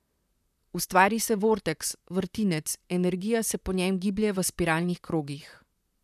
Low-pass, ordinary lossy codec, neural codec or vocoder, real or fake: 14.4 kHz; none; vocoder, 44.1 kHz, 128 mel bands, Pupu-Vocoder; fake